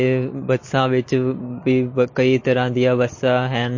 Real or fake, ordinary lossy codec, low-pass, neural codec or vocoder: real; MP3, 32 kbps; 7.2 kHz; none